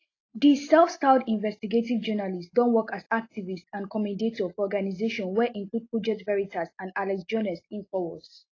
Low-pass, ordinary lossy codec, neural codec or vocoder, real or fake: 7.2 kHz; AAC, 32 kbps; none; real